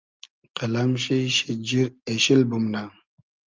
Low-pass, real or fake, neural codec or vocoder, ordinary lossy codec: 7.2 kHz; real; none; Opus, 24 kbps